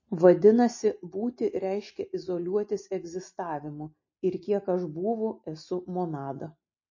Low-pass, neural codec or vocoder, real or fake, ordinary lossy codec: 7.2 kHz; none; real; MP3, 32 kbps